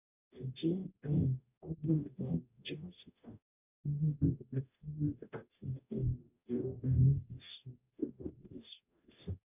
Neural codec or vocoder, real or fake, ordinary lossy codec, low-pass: codec, 44.1 kHz, 0.9 kbps, DAC; fake; none; 3.6 kHz